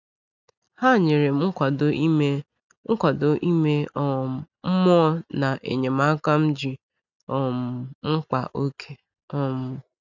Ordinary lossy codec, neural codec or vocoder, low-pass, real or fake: none; none; 7.2 kHz; real